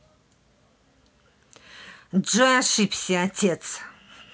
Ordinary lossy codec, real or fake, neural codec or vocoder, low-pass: none; real; none; none